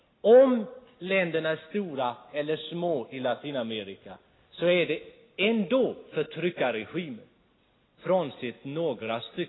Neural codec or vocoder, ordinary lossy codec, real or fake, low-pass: none; AAC, 16 kbps; real; 7.2 kHz